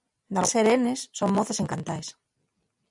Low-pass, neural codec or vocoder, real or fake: 10.8 kHz; none; real